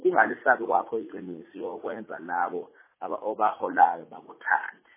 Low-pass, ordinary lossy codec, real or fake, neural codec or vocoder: 3.6 kHz; MP3, 16 kbps; fake; vocoder, 44.1 kHz, 80 mel bands, Vocos